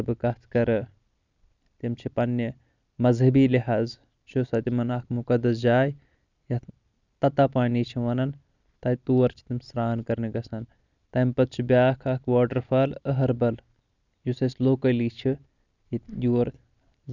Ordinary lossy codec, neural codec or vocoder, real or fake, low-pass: none; none; real; 7.2 kHz